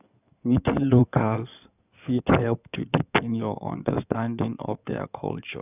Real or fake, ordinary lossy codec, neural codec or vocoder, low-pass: fake; none; codec, 16 kHz in and 24 kHz out, 2.2 kbps, FireRedTTS-2 codec; 3.6 kHz